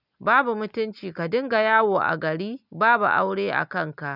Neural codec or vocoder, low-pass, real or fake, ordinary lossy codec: none; 5.4 kHz; real; none